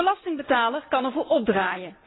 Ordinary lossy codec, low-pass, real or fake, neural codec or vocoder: AAC, 16 kbps; 7.2 kHz; real; none